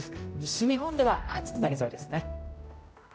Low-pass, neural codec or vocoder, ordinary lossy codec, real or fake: none; codec, 16 kHz, 0.5 kbps, X-Codec, HuBERT features, trained on general audio; none; fake